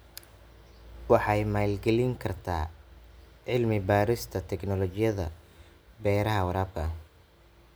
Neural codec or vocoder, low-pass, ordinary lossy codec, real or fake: none; none; none; real